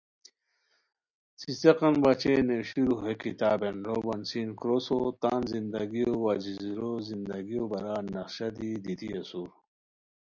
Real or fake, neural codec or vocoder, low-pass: real; none; 7.2 kHz